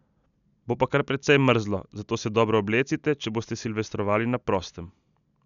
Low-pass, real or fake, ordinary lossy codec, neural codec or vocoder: 7.2 kHz; real; none; none